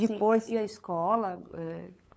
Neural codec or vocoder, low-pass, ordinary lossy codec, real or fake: codec, 16 kHz, 8 kbps, FunCodec, trained on LibriTTS, 25 frames a second; none; none; fake